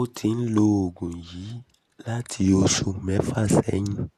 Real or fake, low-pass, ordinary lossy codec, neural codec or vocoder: fake; 14.4 kHz; Opus, 64 kbps; vocoder, 48 kHz, 128 mel bands, Vocos